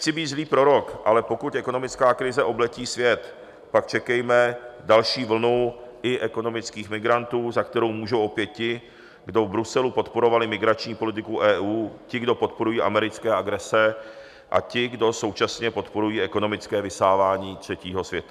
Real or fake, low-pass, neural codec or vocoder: real; 14.4 kHz; none